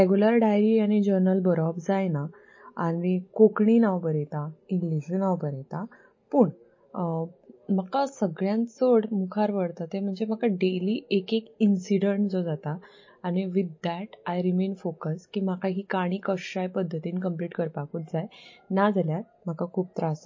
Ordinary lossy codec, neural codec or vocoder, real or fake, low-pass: MP3, 32 kbps; none; real; 7.2 kHz